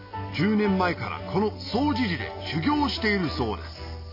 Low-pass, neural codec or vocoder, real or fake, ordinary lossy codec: 5.4 kHz; none; real; AAC, 32 kbps